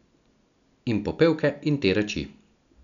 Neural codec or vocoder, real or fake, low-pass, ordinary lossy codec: none; real; 7.2 kHz; none